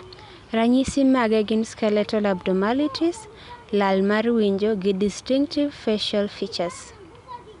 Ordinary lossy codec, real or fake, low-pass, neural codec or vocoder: none; real; 10.8 kHz; none